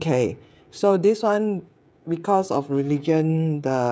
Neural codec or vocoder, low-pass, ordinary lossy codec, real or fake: codec, 16 kHz, 16 kbps, FreqCodec, smaller model; none; none; fake